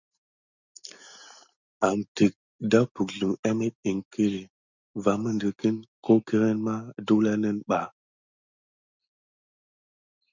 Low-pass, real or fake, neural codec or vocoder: 7.2 kHz; real; none